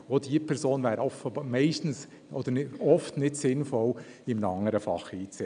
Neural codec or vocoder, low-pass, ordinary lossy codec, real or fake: none; 9.9 kHz; none; real